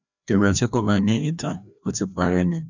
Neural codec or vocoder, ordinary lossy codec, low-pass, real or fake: codec, 16 kHz, 1 kbps, FreqCodec, larger model; none; 7.2 kHz; fake